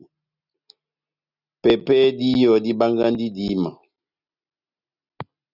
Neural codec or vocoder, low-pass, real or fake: none; 5.4 kHz; real